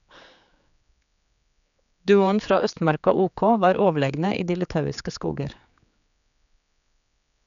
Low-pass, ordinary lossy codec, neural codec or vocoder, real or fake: 7.2 kHz; none; codec, 16 kHz, 4 kbps, X-Codec, HuBERT features, trained on general audio; fake